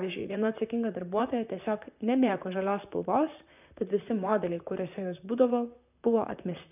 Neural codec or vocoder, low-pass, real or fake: vocoder, 44.1 kHz, 128 mel bands, Pupu-Vocoder; 3.6 kHz; fake